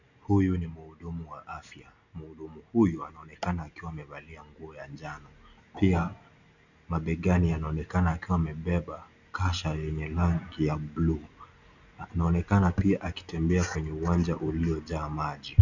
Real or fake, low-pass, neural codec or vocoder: real; 7.2 kHz; none